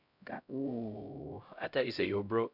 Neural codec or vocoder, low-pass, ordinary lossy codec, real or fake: codec, 16 kHz, 0.5 kbps, X-Codec, HuBERT features, trained on LibriSpeech; 5.4 kHz; none; fake